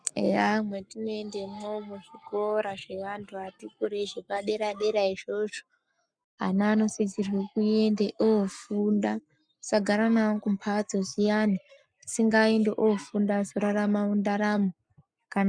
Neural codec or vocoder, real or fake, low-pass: codec, 44.1 kHz, 7.8 kbps, Pupu-Codec; fake; 9.9 kHz